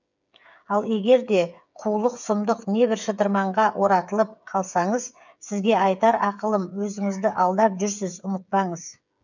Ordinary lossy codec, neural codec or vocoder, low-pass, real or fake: none; codec, 16 kHz, 8 kbps, FreqCodec, smaller model; 7.2 kHz; fake